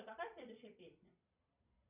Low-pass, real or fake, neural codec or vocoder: 3.6 kHz; fake; vocoder, 22.05 kHz, 80 mel bands, Vocos